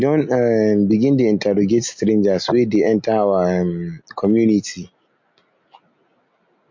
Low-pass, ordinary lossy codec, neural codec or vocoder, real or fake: 7.2 kHz; MP3, 48 kbps; none; real